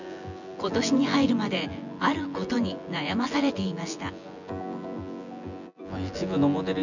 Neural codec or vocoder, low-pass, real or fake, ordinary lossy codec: vocoder, 24 kHz, 100 mel bands, Vocos; 7.2 kHz; fake; none